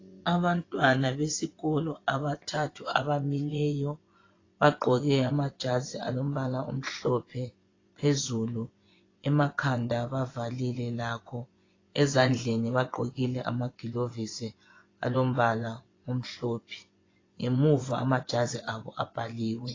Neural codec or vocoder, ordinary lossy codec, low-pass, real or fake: vocoder, 24 kHz, 100 mel bands, Vocos; AAC, 32 kbps; 7.2 kHz; fake